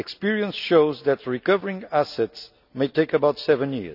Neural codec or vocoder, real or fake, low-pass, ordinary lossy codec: none; real; 5.4 kHz; none